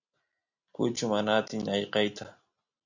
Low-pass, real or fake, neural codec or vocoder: 7.2 kHz; real; none